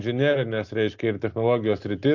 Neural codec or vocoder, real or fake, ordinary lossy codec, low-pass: none; real; AAC, 48 kbps; 7.2 kHz